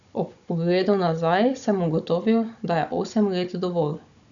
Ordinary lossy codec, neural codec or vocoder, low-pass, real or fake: none; codec, 16 kHz, 16 kbps, FunCodec, trained on Chinese and English, 50 frames a second; 7.2 kHz; fake